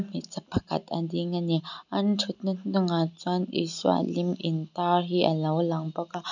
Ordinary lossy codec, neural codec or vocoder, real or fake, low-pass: none; none; real; 7.2 kHz